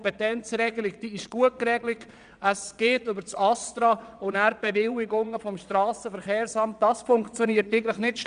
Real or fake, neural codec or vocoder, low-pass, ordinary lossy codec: fake; vocoder, 22.05 kHz, 80 mel bands, Vocos; 9.9 kHz; none